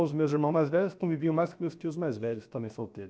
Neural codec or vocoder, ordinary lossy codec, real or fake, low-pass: codec, 16 kHz, about 1 kbps, DyCAST, with the encoder's durations; none; fake; none